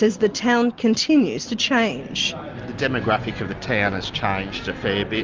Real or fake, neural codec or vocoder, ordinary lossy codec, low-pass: real; none; Opus, 32 kbps; 7.2 kHz